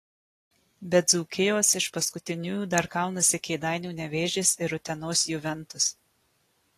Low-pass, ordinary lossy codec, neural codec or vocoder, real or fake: 14.4 kHz; AAC, 48 kbps; none; real